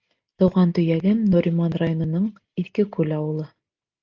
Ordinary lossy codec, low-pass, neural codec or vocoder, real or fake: Opus, 24 kbps; 7.2 kHz; none; real